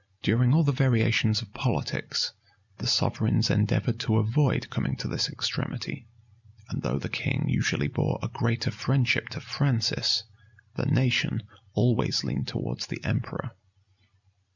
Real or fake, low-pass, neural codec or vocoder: real; 7.2 kHz; none